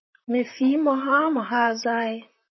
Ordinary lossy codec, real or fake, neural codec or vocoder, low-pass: MP3, 24 kbps; fake; vocoder, 44.1 kHz, 128 mel bands, Pupu-Vocoder; 7.2 kHz